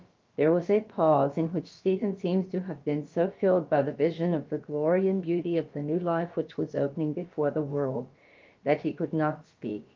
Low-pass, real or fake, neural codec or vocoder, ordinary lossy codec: 7.2 kHz; fake; codec, 16 kHz, about 1 kbps, DyCAST, with the encoder's durations; Opus, 32 kbps